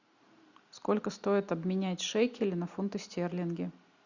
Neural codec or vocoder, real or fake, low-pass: none; real; 7.2 kHz